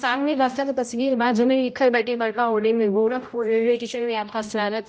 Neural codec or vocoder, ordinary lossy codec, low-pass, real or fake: codec, 16 kHz, 0.5 kbps, X-Codec, HuBERT features, trained on general audio; none; none; fake